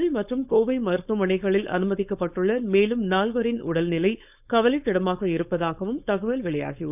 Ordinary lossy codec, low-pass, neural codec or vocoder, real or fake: none; 3.6 kHz; codec, 16 kHz, 4.8 kbps, FACodec; fake